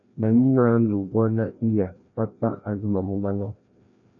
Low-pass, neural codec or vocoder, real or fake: 7.2 kHz; codec, 16 kHz, 1 kbps, FreqCodec, larger model; fake